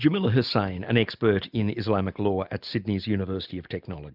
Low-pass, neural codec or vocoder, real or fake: 5.4 kHz; none; real